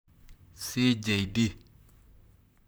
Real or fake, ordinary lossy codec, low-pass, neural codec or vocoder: fake; none; none; vocoder, 44.1 kHz, 128 mel bands, Pupu-Vocoder